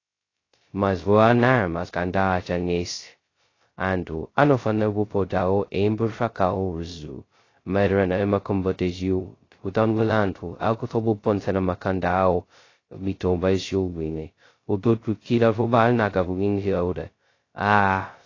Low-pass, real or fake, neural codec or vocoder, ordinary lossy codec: 7.2 kHz; fake; codec, 16 kHz, 0.2 kbps, FocalCodec; AAC, 32 kbps